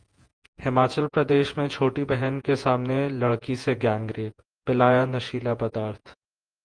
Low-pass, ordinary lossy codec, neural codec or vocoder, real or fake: 9.9 kHz; Opus, 32 kbps; vocoder, 48 kHz, 128 mel bands, Vocos; fake